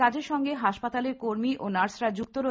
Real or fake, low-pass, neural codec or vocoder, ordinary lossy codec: real; none; none; none